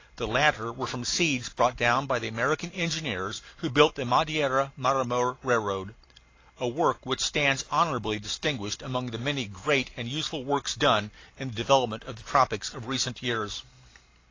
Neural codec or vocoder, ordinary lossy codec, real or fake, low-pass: none; AAC, 32 kbps; real; 7.2 kHz